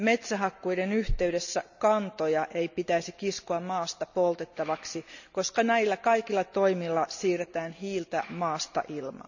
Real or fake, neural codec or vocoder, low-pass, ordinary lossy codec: real; none; 7.2 kHz; none